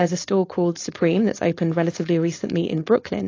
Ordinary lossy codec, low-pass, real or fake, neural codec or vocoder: AAC, 32 kbps; 7.2 kHz; fake; codec, 16 kHz, 4.8 kbps, FACodec